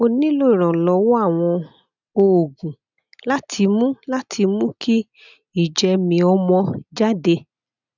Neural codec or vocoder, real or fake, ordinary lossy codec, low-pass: none; real; none; 7.2 kHz